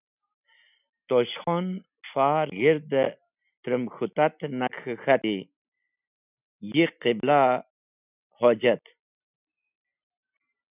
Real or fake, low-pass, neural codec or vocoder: real; 3.6 kHz; none